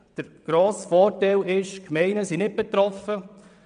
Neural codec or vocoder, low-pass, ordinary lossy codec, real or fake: vocoder, 22.05 kHz, 80 mel bands, WaveNeXt; 9.9 kHz; none; fake